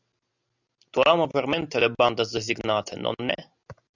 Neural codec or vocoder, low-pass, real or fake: none; 7.2 kHz; real